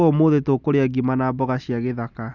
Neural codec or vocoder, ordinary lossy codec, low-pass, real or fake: none; Opus, 64 kbps; 7.2 kHz; real